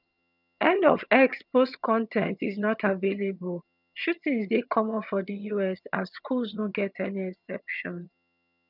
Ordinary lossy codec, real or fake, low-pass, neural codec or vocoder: none; fake; 5.4 kHz; vocoder, 22.05 kHz, 80 mel bands, HiFi-GAN